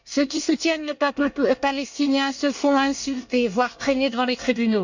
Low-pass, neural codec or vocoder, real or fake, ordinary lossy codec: 7.2 kHz; codec, 24 kHz, 1 kbps, SNAC; fake; none